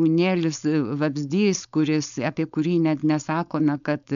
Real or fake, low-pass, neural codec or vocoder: fake; 7.2 kHz; codec, 16 kHz, 4.8 kbps, FACodec